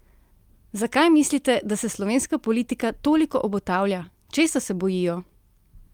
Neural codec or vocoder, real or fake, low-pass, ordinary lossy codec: none; real; 19.8 kHz; Opus, 32 kbps